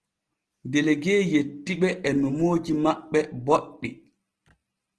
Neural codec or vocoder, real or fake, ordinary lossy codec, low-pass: none; real; Opus, 16 kbps; 10.8 kHz